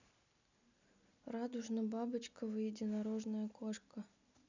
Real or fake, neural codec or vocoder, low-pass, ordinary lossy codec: real; none; 7.2 kHz; none